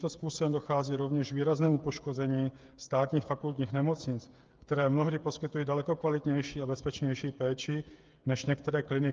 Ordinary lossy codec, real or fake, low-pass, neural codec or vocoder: Opus, 24 kbps; fake; 7.2 kHz; codec, 16 kHz, 8 kbps, FreqCodec, smaller model